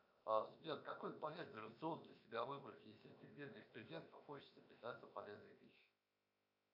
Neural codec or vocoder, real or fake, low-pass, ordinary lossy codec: codec, 16 kHz, 0.7 kbps, FocalCodec; fake; 5.4 kHz; MP3, 48 kbps